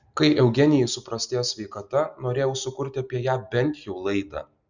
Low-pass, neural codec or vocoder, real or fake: 7.2 kHz; none; real